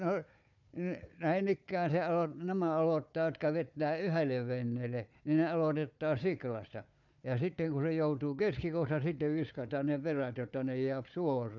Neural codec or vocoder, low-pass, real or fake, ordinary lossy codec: codec, 16 kHz, 4 kbps, FunCodec, trained on Chinese and English, 50 frames a second; 7.2 kHz; fake; none